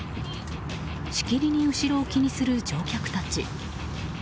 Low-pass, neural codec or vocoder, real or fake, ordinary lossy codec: none; none; real; none